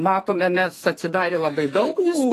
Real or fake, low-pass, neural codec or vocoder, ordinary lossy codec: fake; 14.4 kHz; codec, 44.1 kHz, 2.6 kbps, SNAC; AAC, 48 kbps